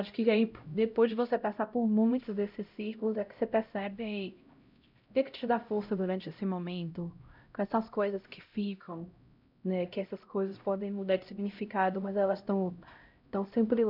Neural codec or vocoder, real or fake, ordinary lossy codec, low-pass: codec, 16 kHz, 0.5 kbps, X-Codec, HuBERT features, trained on LibriSpeech; fake; none; 5.4 kHz